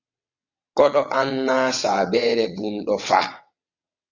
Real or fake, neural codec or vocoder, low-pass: fake; vocoder, 22.05 kHz, 80 mel bands, WaveNeXt; 7.2 kHz